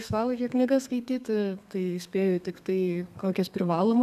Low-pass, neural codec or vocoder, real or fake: 14.4 kHz; codec, 32 kHz, 1.9 kbps, SNAC; fake